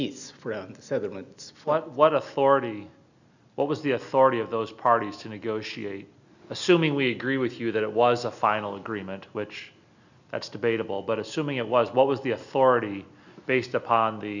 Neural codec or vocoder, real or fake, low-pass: none; real; 7.2 kHz